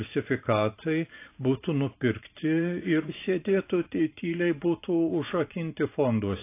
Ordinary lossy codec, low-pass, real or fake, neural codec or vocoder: AAC, 24 kbps; 3.6 kHz; fake; vocoder, 44.1 kHz, 128 mel bands every 512 samples, BigVGAN v2